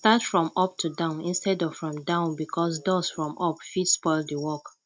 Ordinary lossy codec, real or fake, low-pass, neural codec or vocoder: none; real; none; none